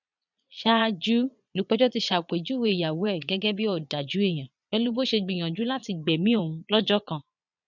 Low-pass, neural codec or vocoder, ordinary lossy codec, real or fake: 7.2 kHz; vocoder, 22.05 kHz, 80 mel bands, Vocos; none; fake